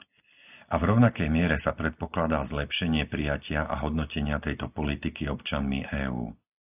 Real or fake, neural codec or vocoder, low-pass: real; none; 3.6 kHz